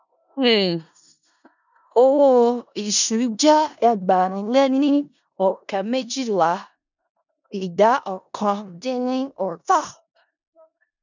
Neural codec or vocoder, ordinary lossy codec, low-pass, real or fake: codec, 16 kHz in and 24 kHz out, 0.4 kbps, LongCat-Audio-Codec, four codebook decoder; none; 7.2 kHz; fake